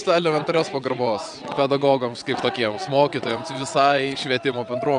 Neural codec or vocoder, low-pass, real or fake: vocoder, 22.05 kHz, 80 mel bands, WaveNeXt; 9.9 kHz; fake